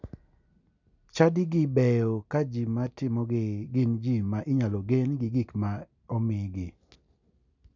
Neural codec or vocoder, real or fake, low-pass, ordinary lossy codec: none; real; 7.2 kHz; none